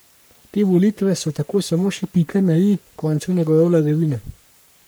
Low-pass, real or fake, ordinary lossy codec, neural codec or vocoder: none; fake; none; codec, 44.1 kHz, 3.4 kbps, Pupu-Codec